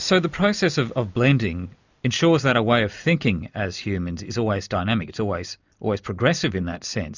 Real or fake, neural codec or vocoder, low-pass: real; none; 7.2 kHz